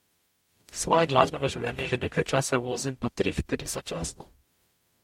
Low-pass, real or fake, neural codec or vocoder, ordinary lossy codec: 19.8 kHz; fake; codec, 44.1 kHz, 0.9 kbps, DAC; MP3, 64 kbps